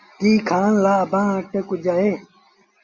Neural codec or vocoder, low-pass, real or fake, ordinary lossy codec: none; 7.2 kHz; real; Opus, 64 kbps